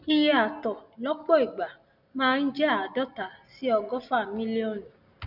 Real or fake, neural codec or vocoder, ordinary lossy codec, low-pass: real; none; none; 5.4 kHz